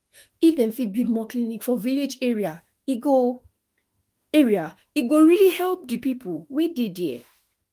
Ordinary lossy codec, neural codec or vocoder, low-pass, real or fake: Opus, 32 kbps; autoencoder, 48 kHz, 32 numbers a frame, DAC-VAE, trained on Japanese speech; 14.4 kHz; fake